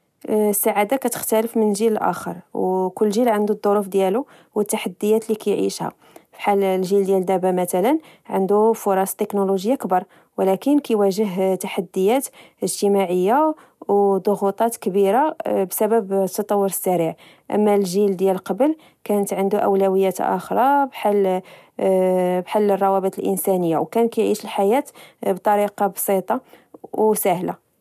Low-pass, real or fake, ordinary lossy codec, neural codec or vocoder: 14.4 kHz; real; none; none